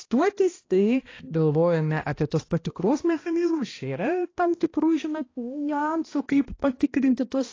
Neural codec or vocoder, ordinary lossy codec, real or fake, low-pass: codec, 16 kHz, 1 kbps, X-Codec, HuBERT features, trained on balanced general audio; AAC, 32 kbps; fake; 7.2 kHz